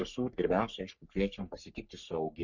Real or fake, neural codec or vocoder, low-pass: fake; codec, 44.1 kHz, 3.4 kbps, Pupu-Codec; 7.2 kHz